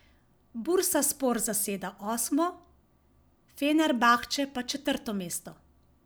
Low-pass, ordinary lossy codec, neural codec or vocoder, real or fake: none; none; none; real